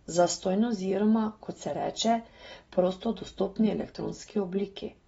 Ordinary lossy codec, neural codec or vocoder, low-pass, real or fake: AAC, 24 kbps; none; 19.8 kHz; real